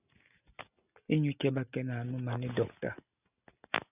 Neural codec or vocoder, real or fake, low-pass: none; real; 3.6 kHz